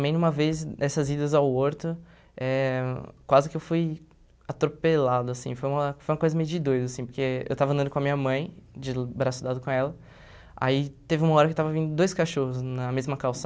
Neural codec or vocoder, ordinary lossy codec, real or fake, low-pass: none; none; real; none